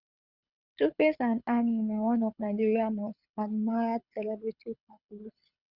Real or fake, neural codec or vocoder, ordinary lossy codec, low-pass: fake; codec, 24 kHz, 6 kbps, HILCodec; Opus, 64 kbps; 5.4 kHz